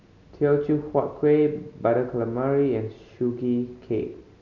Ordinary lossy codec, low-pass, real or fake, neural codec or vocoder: none; 7.2 kHz; real; none